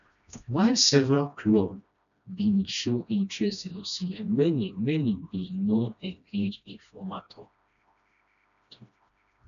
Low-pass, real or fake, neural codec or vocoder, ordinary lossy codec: 7.2 kHz; fake; codec, 16 kHz, 1 kbps, FreqCodec, smaller model; none